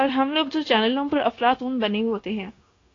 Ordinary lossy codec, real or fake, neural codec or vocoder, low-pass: AAC, 32 kbps; fake; codec, 16 kHz, 0.9 kbps, LongCat-Audio-Codec; 7.2 kHz